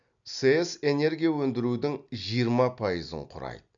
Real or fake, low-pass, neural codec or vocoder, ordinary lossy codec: real; 7.2 kHz; none; none